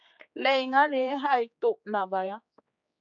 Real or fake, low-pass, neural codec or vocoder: fake; 7.2 kHz; codec, 16 kHz, 4 kbps, X-Codec, HuBERT features, trained on general audio